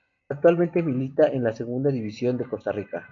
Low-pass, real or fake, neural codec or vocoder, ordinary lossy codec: 7.2 kHz; real; none; AAC, 64 kbps